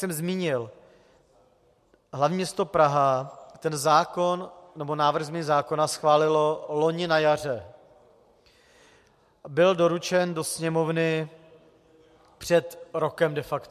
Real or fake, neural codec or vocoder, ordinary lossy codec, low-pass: real; none; MP3, 64 kbps; 14.4 kHz